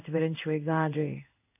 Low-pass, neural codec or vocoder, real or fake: 3.6 kHz; codec, 16 kHz in and 24 kHz out, 1 kbps, XY-Tokenizer; fake